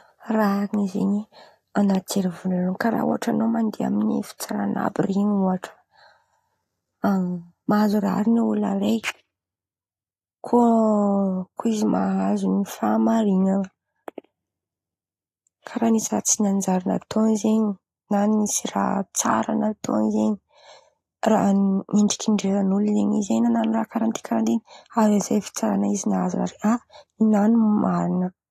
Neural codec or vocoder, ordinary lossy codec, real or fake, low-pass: none; AAC, 48 kbps; real; 14.4 kHz